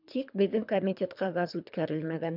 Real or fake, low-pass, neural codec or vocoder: fake; 5.4 kHz; codec, 24 kHz, 3 kbps, HILCodec